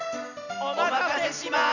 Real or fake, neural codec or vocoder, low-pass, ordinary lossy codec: real; none; 7.2 kHz; none